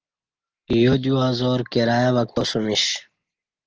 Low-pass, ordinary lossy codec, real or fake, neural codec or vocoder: 7.2 kHz; Opus, 16 kbps; real; none